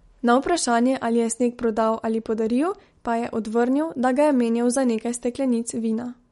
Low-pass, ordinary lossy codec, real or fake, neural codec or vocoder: 19.8 kHz; MP3, 48 kbps; real; none